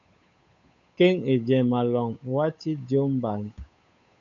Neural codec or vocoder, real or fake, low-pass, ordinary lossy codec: codec, 16 kHz, 8 kbps, FunCodec, trained on Chinese and English, 25 frames a second; fake; 7.2 kHz; MP3, 96 kbps